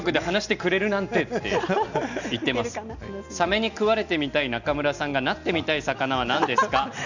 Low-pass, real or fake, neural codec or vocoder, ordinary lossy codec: 7.2 kHz; real; none; none